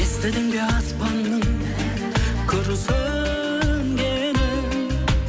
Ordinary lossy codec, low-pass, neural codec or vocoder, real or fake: none; none; none; real